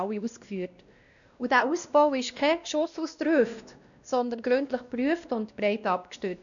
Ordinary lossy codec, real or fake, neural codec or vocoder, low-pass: none; fake; codec, 16 kHz, 1 kbps, X-Codec, WavLM features, trained on Multilingual LibriSpeech; 7.2 kHz